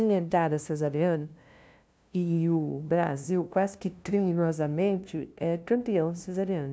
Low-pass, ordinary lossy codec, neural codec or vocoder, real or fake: none; none; codec, 16 kHz, 0.5 kbps, FunCodec, trained on LibriTTS, 25 frames a second; fake